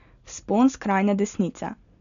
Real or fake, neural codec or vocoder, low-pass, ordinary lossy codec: real; none; 7.2 kHz; none